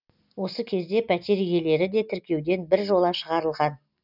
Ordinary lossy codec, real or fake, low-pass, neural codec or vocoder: none; real; 5.4 kHz; none